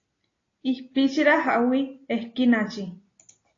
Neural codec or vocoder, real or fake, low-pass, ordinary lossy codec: none; real; 7.2 kHz; AAC, 32 kbps